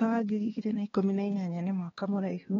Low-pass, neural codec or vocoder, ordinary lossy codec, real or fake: 7.2 kHz; codec, 16 kHz, 2 kbps, X-Codec, HuBERT features, trained on balanced general audio; AAC, 24 kbps; fake